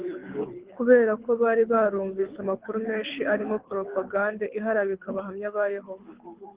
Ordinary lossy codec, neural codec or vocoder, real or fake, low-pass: Opus, 16 kbps; codec, 24 kHz, 6 kbps, HILCodec; fake; 3.6 kHz